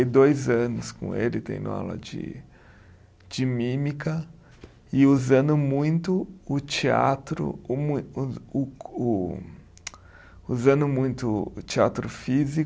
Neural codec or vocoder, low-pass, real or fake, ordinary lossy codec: none; none; real; none